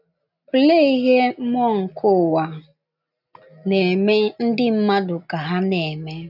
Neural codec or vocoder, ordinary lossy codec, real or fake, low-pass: none; none; real; 5.4 kHz